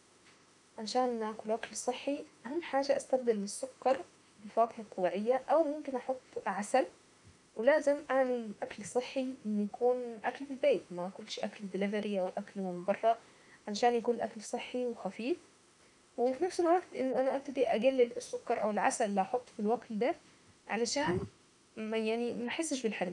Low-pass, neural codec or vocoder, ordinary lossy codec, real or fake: 10.8 kHz; autoencoder, 48 kHz, 32 numbers a frame, DAC-VAE, trained on Japanese speech; none; fake